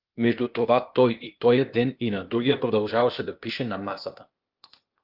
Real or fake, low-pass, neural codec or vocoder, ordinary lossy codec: fake; 5.4 kHz; codec, 16 kHz, 0.8 kbps, ZipCodec; Opus, 32 kbps